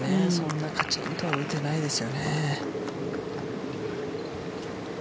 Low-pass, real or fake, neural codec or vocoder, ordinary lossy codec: none; real; none; none